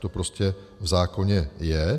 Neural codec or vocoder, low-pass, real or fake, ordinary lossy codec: none; 14.4 kHz; real; MP3, 96 kbps